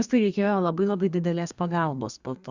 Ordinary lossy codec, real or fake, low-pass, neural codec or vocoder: Opus, 64 kbps; fake; 7.2 kHz; codec, 32 kHz, 1.9 kbps, SNAC